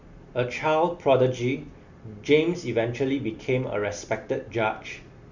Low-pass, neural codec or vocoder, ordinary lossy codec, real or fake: 7.2 kHz; none; Opus, 64 kbps; real